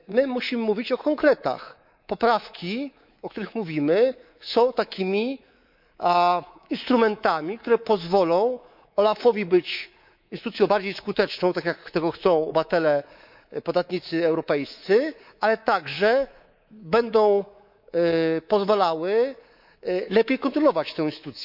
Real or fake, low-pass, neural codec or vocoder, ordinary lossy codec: fake; 5.4 kHz; codec, 24 kHz, 3.1 kbps, DualCodec; none